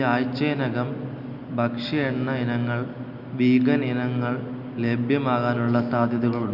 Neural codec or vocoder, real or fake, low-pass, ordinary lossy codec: none; real; 5.4 kHz; none